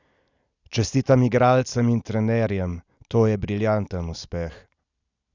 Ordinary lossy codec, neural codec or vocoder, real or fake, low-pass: none; none; real; 7.2 kHz